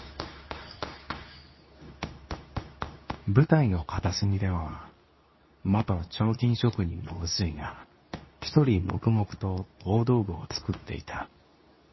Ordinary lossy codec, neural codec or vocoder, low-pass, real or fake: MP3, 24 kbps; codec, 24 kHz, 0.9 kbps, WavTokenizer, medium speech release version 2; 7.2 kHz; fake